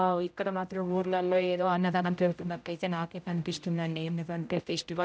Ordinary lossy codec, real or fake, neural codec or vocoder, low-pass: none; fake; codec, 16 kHz, 0.5 kbps, X-Codec, HuBERT features, trained on general audio; none